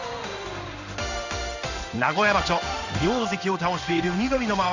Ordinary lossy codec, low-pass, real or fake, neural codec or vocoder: none; 7.2 kHz; fake; codec, 16 kHz in and 24 kHz out, 1 kbps, XY-Tokenizer